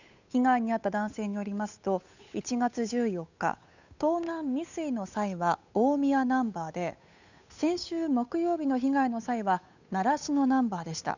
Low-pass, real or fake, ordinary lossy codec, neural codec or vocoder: 7.2 kHz; fake; none; codec, 16 kHz, 8 kbps, FunCodec, trained on Chinese and English, 25 frames a second